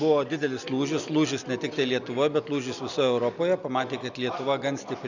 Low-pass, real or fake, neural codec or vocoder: 7.2 kHz; real; none